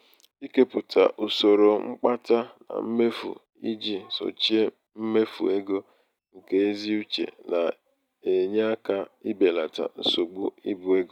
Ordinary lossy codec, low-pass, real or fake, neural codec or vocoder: none; 19.8 kHz; real; none